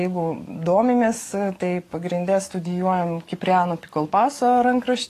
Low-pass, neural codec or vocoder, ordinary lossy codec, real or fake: 14.4 kHz; codec, 44.1 kHz, 7.8 kbps, DAC; AAC, 48 kbps; fake